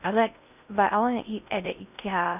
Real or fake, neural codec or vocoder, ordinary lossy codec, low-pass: fake; codec, 16 kHz in and 24 kHz out, 0.6 kbps, FocalCodec, streaming, 2048 codes; none; 3.6 kHz